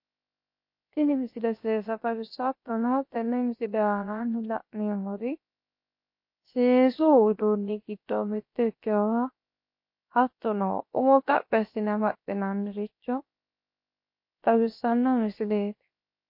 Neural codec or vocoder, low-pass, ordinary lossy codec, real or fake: codec, 16 kHz, 0.7 kbps, FocalCodec; 5.4 kHz; MP3, 32 kbps; fake